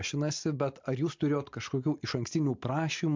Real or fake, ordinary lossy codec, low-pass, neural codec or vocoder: real; MP3, 64 kbps; 7.2 kHz; none